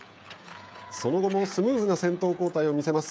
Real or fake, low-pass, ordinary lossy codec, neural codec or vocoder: fake; none; none; codec, 16 kHz, 16 kbps, FreqCodec, smaller model